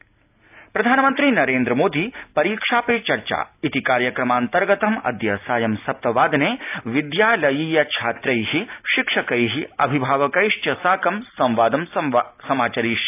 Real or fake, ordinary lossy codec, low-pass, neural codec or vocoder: real; AAC, 32 kbps; 3.6 kHz; none